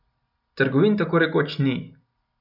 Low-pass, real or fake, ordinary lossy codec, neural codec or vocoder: 5.4 kHz; real; none; none